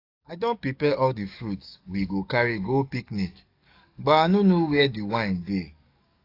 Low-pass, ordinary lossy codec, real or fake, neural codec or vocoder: 5.4 kHz; AAC, 32 kbps; fake; codec, 44.1 kHz, 7.8 kbps, DAC